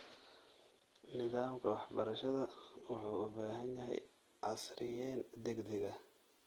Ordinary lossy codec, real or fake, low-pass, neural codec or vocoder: Opus, 16 kbps; fake; 19.8 kHz; vocoder, 48 kHz, 128 mel bands, Vocos